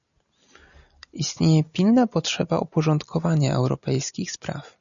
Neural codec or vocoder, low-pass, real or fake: none; 7.2 kHz; real